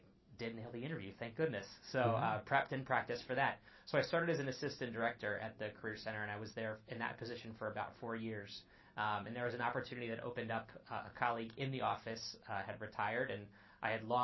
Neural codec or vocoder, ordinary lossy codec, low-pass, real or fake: none; MP3, 24 kbps; 7.2 kHz; real